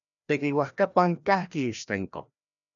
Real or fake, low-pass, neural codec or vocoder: fake; 7.2 kHz; codec, 16 kHz, 1 kbps, FreqCodec, larger model